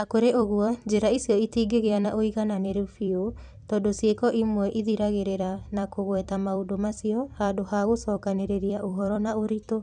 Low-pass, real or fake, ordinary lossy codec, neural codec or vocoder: 10.8 kHz; fake; none; vocoder, 44.1 kHz, 128 mel bands every 256 samples, BigVGAN v2